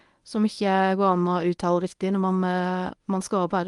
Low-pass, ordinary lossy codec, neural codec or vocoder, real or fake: 10.8 kHz; Opus, 32 kbps; codec, 24 kHz, 0.9 kbps, WavTokenizer, medium speech release version 1; fake